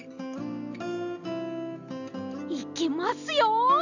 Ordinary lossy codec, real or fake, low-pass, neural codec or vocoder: none; real; 7.2 kHz; none